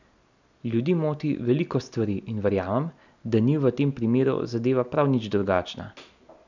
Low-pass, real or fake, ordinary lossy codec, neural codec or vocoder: 7.2 kHz; real; none; none